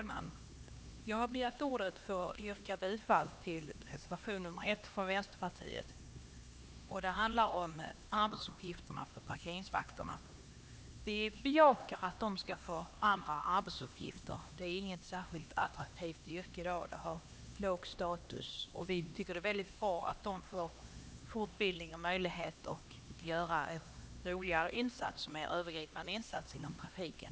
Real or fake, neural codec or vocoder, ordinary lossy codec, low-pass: fake; codec, 16 kHz, 2 kbps, X-Codec, HuBERT features, trained on LibriSpeech; none; none